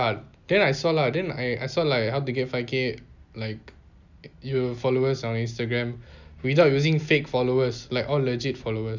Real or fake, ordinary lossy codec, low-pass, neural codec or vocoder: real; none; 7.2 kHz; none